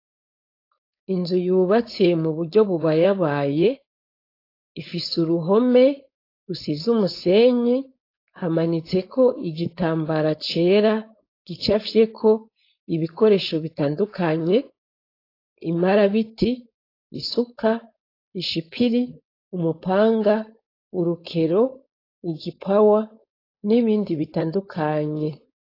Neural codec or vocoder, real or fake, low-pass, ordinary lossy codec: codec, 16 kHz, 4.8 kbps, FACodec; fake; 5.4 kHz; AAC, 32 kbps